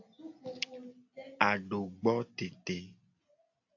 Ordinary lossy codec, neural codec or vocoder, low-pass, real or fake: AAC, 48 kbps; none; 7.2 kHz; real